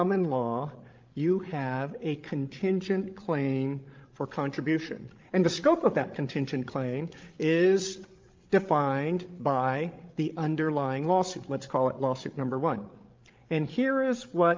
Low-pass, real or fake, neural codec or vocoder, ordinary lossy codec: 7.2 kHz; fake; codec, 16 kHz, 16 kbps, FreqCodec, larger model; Opus, 24 kbps